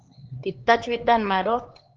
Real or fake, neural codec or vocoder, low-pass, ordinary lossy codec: fake; codec, 16 kHz, 4 kbps, X-Codec, HuBERT features, trained on LibriSpeech; 7.2 kHz; Opus, 16 kbps